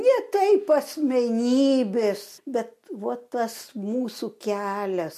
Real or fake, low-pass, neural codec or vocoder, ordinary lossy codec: real; 14.4 kHz; none; MP3, 64 kbps